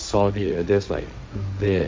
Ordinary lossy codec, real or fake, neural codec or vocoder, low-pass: none; fake; codec, 16 kHz, 1.1 kbps, Voila-Tokenizer; none